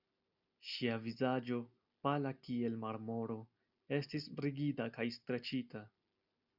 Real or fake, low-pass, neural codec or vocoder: real; 5.4 kHz; none